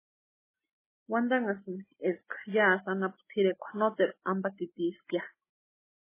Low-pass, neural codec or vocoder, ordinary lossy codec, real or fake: 3.6 kHz; none; MP3, 16 kbps; real